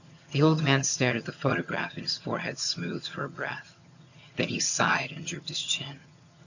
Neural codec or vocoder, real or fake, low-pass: vocoder, 22.05 kHz, 80 mel bands, HiFi-GAN; fake; 7.2 kHz